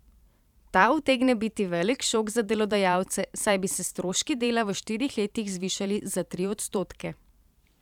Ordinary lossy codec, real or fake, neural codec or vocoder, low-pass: none; fake; vocoder, 44.1 kHz, 128 mel bands every 512 samples, BigVGAN v2; 19.8 kHz